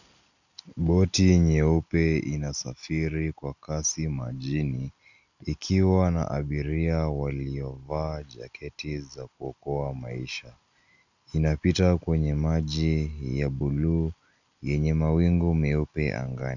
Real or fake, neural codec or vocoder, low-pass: real; none; 7.2 kHz